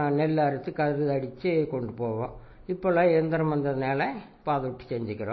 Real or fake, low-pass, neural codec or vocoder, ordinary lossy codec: real; 7.2 kHz; none; MP3, 24 kbps